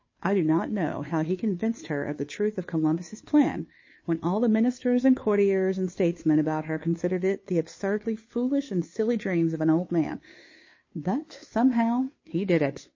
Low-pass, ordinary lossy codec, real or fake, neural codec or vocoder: 7.2 kHz; MP3, 32 kbps; fake; codec, 16 kHz, 2 kbps, FunCodec, trained on Chinese and English, 25 frames a second